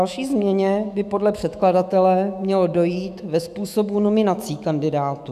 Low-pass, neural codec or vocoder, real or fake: 14.4 kHz; autoencoder, 48 kHz, 128 numbers a frame, DAC-VAE, trained on Japanese speech; fake